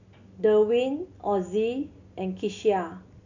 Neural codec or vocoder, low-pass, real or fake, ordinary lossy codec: none; 7.2 kHz; real; none